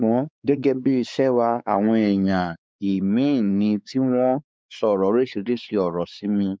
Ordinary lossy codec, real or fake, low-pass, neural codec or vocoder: none; fake; none; codec, 16 kHz, 4 kbps, X-Codec, WavLM features, trained on Multilingual LibriSpeech